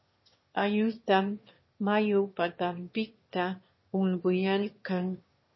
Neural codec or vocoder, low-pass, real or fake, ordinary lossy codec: autoencoder, 22.05 kHz, a latent of 192 numbers a frame, VITS, trained on one speaker; 7.2 kHz; fake; MP3, 24 kbps